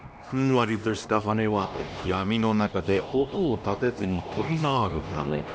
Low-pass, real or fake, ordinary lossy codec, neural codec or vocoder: none; fake; none; codec, 16 kHz, 1 kbps, X-Codec, HuBERT features, trained on LibriSpeech